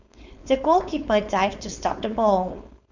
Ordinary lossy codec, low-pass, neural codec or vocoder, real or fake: none; 7.2 kHz; codec, 16 kHz, 4.8 kbps, FACodec; fake